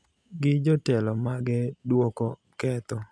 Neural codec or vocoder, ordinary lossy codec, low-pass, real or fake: none; none; none; real